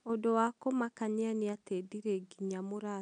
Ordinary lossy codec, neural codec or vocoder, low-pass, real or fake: none; none; 9.9 kHz; real